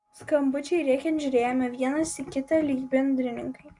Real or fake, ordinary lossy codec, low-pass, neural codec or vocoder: real; Opus, 64 kbps; 10.8 kHz; none